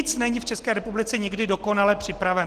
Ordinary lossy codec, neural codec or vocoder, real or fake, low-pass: Opus, 16 kbps; none; real; 14.4 kHz